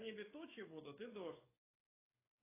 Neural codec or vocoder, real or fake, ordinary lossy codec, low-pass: codec, 44.1 kHz, 7.8 kbps, DAC; fake; AAC, 16 kbps; 3.6 kHz